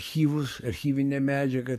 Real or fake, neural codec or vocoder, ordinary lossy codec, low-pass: fake; codec, 44.1 kHz, 7.8 kbps, DAC; MP3, 64 kbps; 14.4 kHz